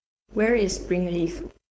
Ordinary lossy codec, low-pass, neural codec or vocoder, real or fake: none; none; codec, 16 kHz, 4.8 kbps, FACodec; fake